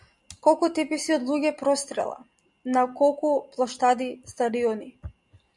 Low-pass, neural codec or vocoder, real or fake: 10.8 kHz; none; real